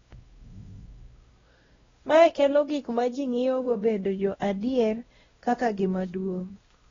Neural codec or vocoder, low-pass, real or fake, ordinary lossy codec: codec, 16 kHz, 1 kbps, X-Codec, WavLM features, trained on Multilingual LibriSpeech; 7.2 kHz; fake; AAC, 24 kbps